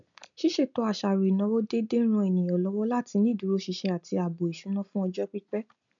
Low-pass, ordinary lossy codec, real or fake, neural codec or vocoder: 7.2 kHz; none; real; none